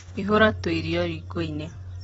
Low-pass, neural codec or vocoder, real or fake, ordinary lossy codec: 19.8 kHz; vocoder, 44.1 kHz, 128 mel bands every 256 samples, BigVGAN v2; fake; AAC, 24 kbps